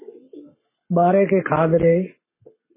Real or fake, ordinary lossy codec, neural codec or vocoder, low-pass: fake; MP3, 16 kbps; codec, 44.1 kHz, 7.8 kbps, Pupu-Codec; 3.6 kHz